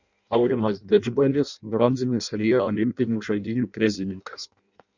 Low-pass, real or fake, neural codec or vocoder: 7.2 kHz; fake; codec, 16 kHz in and 24 kHz out, 0.6 kbps, FireRedTTS-2 codec